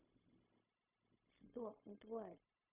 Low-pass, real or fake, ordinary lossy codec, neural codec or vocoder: 3.6 kHz; fake; none; codec, 16 kHz, 0.4 kbps, LongCat-Audio-Codec